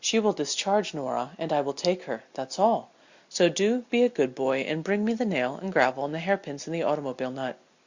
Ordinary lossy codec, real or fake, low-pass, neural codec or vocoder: Opus, 64 kbps; real; 7.2 kHz; none